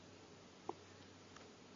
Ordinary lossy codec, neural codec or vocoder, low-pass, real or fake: MP3, 32 kbps; none; 7.2 kHz; real